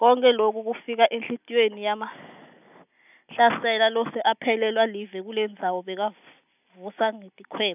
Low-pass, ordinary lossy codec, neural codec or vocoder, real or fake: 3.6 kHz; none; none; real